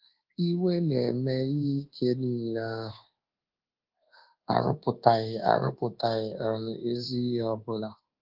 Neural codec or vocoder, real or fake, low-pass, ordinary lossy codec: codec, 24 kHz, 1.2 kbps, DualCodec; fake; 5.4 kHz; Opus, 16 kbps